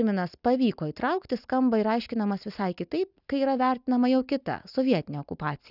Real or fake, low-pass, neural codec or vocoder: real; 5.4 kHz; none